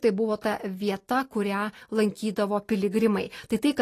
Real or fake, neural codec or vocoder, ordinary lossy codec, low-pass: real; none; AAC, 48 kbps; 14.4 kHz